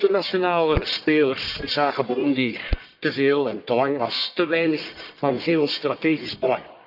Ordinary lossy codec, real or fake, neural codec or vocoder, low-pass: none; fake; codec, 44.1 kHz, 1.7 kbps, Pupu-Codec; 5.4 kHz